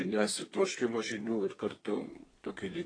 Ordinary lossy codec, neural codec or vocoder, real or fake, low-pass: AAC, 32 kbps; codec, 24 kHz, 1 kbps, SNAC; fake; 9.9 kHz